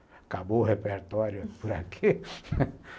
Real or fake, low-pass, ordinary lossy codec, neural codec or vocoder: real; none; none; none